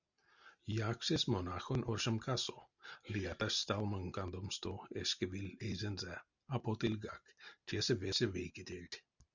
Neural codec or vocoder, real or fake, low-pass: none; real; 7.2 kHz